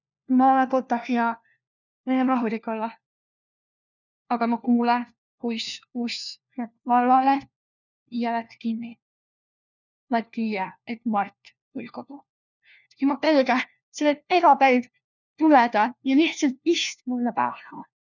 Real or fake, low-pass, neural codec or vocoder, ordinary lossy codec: fake; 7.2 kHz; codec, 16 kHz, 1 kbps, FunCodec, trained on LibriTTS, 50 frames a second; none